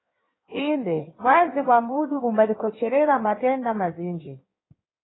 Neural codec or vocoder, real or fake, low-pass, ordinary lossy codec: codec, 16 kHz in and 24 kHz out, 1.1 kbps, FireRedTTS-2 codec; fake; 7.2 kHz; AAC, 16 kbps